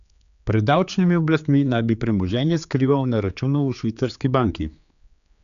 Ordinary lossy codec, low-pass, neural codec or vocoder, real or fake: none; 7.2 kHz; codec, 16 kHz, 4 kbps, X-Codec, HuBERT features, trained on general audio; fake